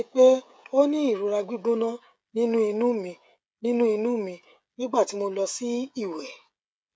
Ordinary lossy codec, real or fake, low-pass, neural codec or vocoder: none; fake; none; codec, 16 kHz, 16 kbps, FreqCodec, smaller model